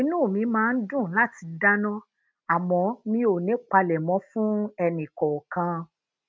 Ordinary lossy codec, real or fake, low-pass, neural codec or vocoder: none; real; none; none